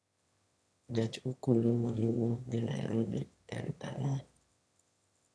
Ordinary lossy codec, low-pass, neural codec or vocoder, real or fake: none; none; autoencoder, 22.05 kHz, a latent of 192 numbers a frame, VITS, trained on one speaker; fake